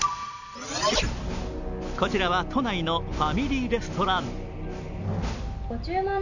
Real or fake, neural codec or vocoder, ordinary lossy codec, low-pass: real; none; none; 7.2 kHz